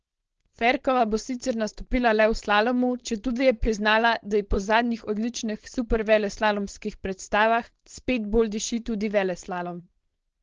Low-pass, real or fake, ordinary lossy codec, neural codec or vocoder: 7.2 kHz; fake; Opus, 16 kbps; codec, 16 kHz, 4.8 kbps, FACodec